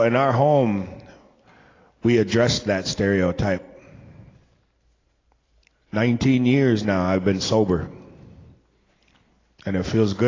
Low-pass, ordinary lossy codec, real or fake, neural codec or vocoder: 7.2 kHz; AAC, 32 kbps; real; none